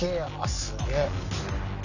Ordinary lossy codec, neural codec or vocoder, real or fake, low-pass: none; none; real; 7.2 kHz